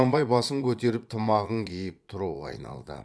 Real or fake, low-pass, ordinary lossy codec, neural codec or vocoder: fake; none; none; vocoder, 22.05 kHz, 80 mel bands, Vocos